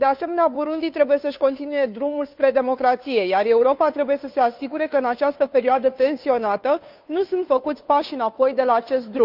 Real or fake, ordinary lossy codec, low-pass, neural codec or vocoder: fake; none; 5.4 kHz; codec, 16 kHz, 2 kbps, FunCodec, trained on Chinese and English, 25 frames a second